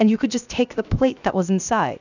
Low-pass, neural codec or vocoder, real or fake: 7.2 kHz; codec, 16 kHz, 0.7 kbps, FocalCodec; fake